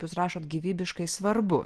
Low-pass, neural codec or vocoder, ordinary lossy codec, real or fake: 10.8 kHz; none; Opus, 16 kbps; real